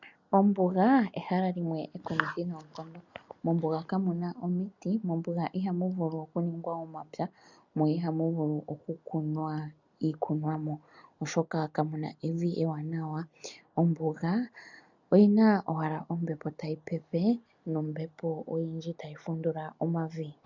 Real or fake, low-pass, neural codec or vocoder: real; 7.2 kHz; none